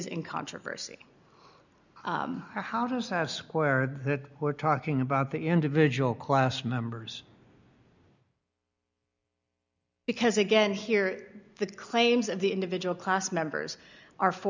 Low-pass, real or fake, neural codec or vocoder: 7.2 kHz; real; none